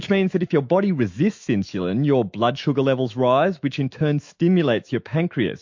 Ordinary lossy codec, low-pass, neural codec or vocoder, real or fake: MP3, 48 kbps; 7.2 kHz; autoencoder, 48 kHz, 128 numbers a frame, DAC-VAE, trained on Japanese speech; fake